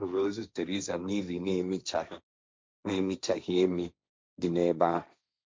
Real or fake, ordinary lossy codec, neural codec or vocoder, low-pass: fake; none; codec, 16 kHz, 1.1 kbps, Voila-Tokenizer; none